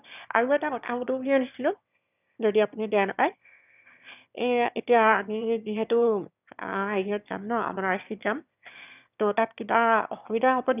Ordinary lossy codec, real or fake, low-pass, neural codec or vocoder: none; fake; 3.6 kHz; autoencoder, 22.05 kHz, a latent of 192 numbers a frame, VITS, trained on one speaker